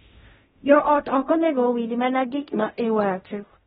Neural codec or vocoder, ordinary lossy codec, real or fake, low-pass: codec, 16 kHz in and 24 kHz out, 0.4 kbps, LongCat-Audio-Codec, fine tuned four codebook decoder; AAC, 16 kbps; fake; 10.8 kHz